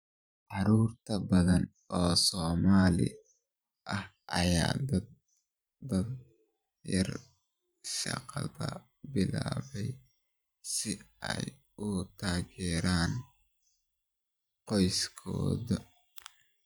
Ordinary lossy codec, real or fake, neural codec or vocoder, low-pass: none; fake; vocoder, 44.1 kHz, 128 mel bands every 512 samples, BigVGAN v2; none